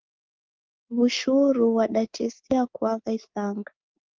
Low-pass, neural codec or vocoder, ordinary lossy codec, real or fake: 7.2 kHz; codec, 24 kHz, 3.1 kbps, DualCodec; Opus, 16 kbps; fake